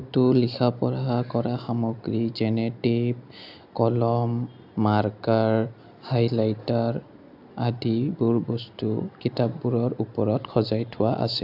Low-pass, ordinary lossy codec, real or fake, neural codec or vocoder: 5.4 kHz; AAC, 48 kbps; real; none